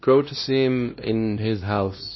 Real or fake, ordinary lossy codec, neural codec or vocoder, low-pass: fake; MP3, 24 kbps; codec, 16 kHz, 1 kbps, X-Codec, WavLM features, trained on Multilingual LibriSpeech; 7.2 kHz